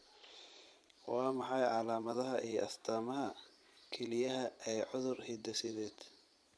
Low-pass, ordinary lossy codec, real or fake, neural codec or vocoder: none; none; fake; vocoder, 22.05 kHz, 80 mel bands, Vocos